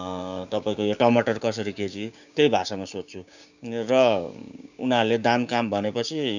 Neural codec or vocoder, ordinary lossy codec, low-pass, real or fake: codec, 16 kHz, 6 kbps, DAC; none; 7.2 kHz; fake